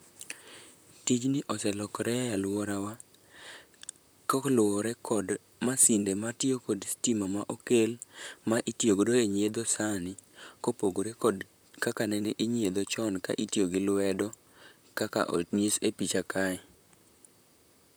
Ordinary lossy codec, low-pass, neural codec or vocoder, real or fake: none; none; vocoder, 44.1 kHz, 128 mel bands, Pupu-Vocoder; fake